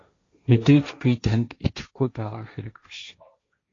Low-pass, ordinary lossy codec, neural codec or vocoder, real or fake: 7.2 kHz; AAC, 32 kbps; codec, 16 kHz, 1.1 kbps, Voila-Tokenizer; fake